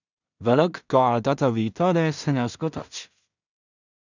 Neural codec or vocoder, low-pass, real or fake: codec, 16 kHz in and 24 kHz out, 0.4 kbps, LongCat-Audio-Codec, two codebook decoder; 7.2 kHz; fake